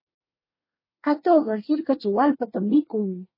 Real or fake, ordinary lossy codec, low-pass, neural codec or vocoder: fake; MP3, 24 kbps; 5.4 kHz; codec, 24 kHz, 1 kbps, SNAC